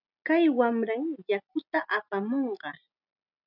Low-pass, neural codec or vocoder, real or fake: 5.4 kHz; none; real